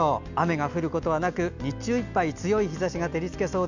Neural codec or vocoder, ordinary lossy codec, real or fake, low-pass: none; none; real; 7.2 kHz